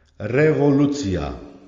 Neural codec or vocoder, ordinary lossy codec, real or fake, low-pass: none; Opus, 32 kbps; real; 7.2 kHz